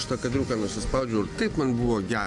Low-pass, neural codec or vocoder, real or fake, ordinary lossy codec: 10.8 kHz; none; real; AAC, 64 kbps